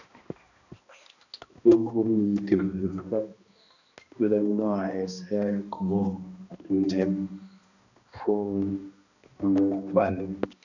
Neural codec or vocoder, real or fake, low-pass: codec, 16 kHz, 1 kbps, X-Codec, HuBERT features, trained on balanced general audio; fake; 7.2 kHz